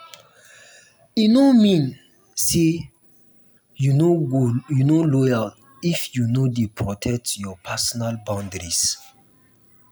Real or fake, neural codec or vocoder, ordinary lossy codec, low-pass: real; none; none; none